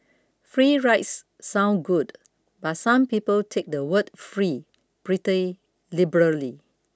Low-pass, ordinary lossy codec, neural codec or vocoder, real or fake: none; none; none; real